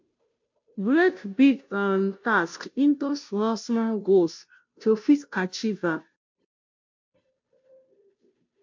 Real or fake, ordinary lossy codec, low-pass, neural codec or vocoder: fake; MP3, 48 kbps; 7.2 kHz; codec, 16 kHz, 0.5 kbps, FunCodec, trained on Chinese and English, 25 frames a second